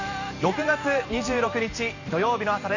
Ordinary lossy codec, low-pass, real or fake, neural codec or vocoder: none; 7.2 kHz; fake; vocoder, 44.1 kHz, 128 mel bands every 512 samples, BigVGAN v2